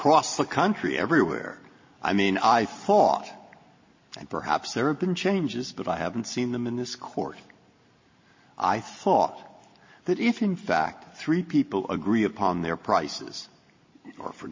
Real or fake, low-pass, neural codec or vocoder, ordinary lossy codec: real; 7.2 kHz; none; MP3, 32 kbps